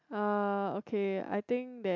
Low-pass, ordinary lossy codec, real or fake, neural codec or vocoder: 7.2 kHz; MP3, 64 kbps; real; none